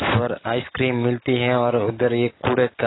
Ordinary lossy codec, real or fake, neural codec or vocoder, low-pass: AAC, 16 kbps; real; none; 7.2 kHz